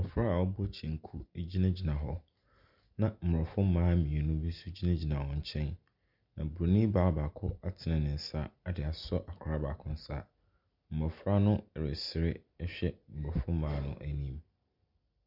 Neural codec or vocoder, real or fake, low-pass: none; real; 5.4 kHz